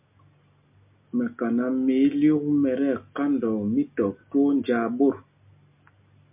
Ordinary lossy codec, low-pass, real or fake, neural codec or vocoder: MP3, 32 kbps; 3.6 kHz; real; none